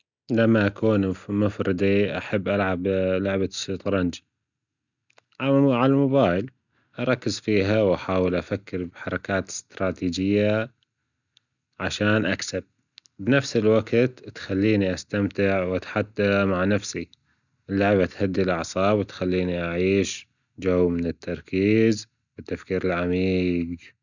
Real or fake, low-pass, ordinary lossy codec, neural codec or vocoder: real; 7.2 kHz; none; none